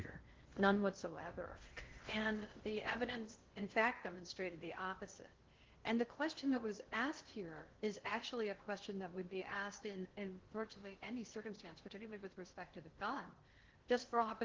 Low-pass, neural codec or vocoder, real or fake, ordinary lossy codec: 7.2 kHz; codec, 16 kHz in and 24 kHz out, 0.6 kbps, FocalCodec, streaming, 4096 codes; fake; Opus, 16 kbps